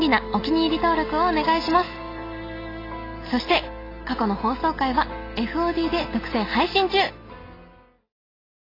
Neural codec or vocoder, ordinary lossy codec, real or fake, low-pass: none; AAC, 24 kbps; real; 5.4 kHz